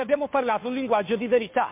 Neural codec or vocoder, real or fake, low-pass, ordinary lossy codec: codec, 16 kHz, 2 kbps, FunCodec, trained on Chinese and English, 25 frames a second; fake; 3.6 kHz; MP3, 32 kbps